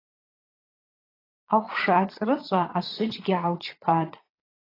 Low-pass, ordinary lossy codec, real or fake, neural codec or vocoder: 5.4 kHz; AAC, 24 kbps; fake; vocoder, 22.05 kHz, 80 mel bands, Vocos